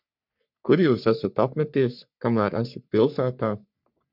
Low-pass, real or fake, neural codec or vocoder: 5.4 kHz; fake; codec, 44.1 kHz, 3.4 kbps, Pupu-Codec